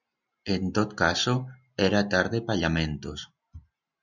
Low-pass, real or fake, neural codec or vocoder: 7.2 kHz; real; none